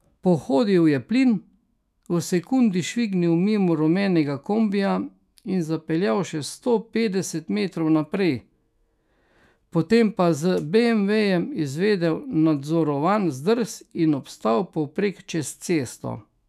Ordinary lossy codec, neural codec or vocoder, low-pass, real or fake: none; autoencoder, 48 kHz, 128 numbers a frame, DAC-VAE, trained on Japanese speech; 14.4 kHz; fake